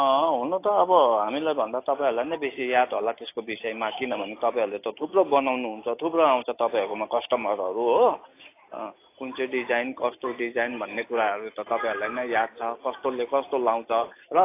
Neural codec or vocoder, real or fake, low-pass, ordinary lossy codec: none; real; 3.6 kHz; AAC, 24 kbps